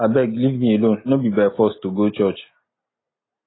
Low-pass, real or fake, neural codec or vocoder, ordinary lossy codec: 7.2 kHz; real; none; AAC, 16 kbps